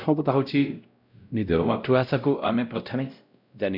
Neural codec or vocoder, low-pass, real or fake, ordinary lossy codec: codec, 16 kHz, 0.5 kbps, X-Codec, WavLM features, trained on Multilingual LibriSpeech; 5.4 kHz; fake; none